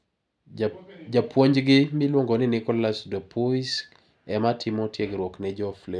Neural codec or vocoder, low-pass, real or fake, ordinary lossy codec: none; none; real; none